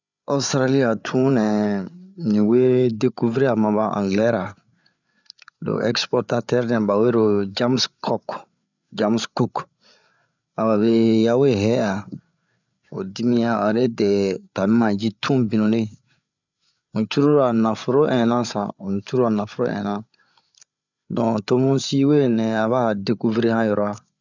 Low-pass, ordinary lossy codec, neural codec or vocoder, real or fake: 7.2 kHz; none; codec, 16 kHz, 8 kbps, FreqCodec, larger model; fake